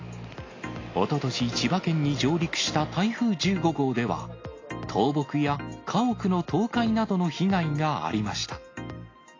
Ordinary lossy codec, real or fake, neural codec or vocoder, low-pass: AAC, 32 kbps; real; none; 7.2 kHz